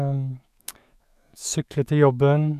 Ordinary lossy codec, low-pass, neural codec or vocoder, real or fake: none; 14.4 kHz; autoencoder, 48 kHz, 128 numbers a frame, DAC-VAE, trained on Japanese speech; fake